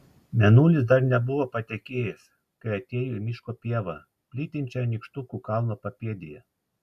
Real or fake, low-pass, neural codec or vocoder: real; 14.4 kHz; none